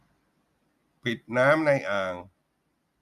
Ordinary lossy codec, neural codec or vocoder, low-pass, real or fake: Opus, 64 kbps; none; 14.4 kHz; real